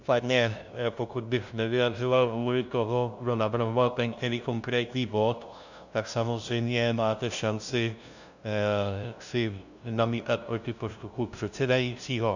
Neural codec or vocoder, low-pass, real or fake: codec, 16 kHz, 0.5 kbps, FunCodec, trained on LibriTTS, 25 frames a second; 7.2 kHz; fake